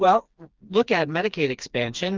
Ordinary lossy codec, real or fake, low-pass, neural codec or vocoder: Opus, 24 kbps; fake; 7.2 kHz; codec, 16 kHz, 2 kbps, FreqCodec, smaller model